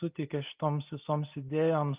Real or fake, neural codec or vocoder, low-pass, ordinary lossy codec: real; none; 3.6 kHz; Opus, 24 kbps